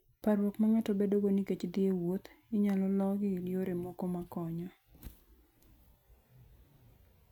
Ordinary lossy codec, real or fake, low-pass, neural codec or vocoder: none; real; 19.8 kHz; none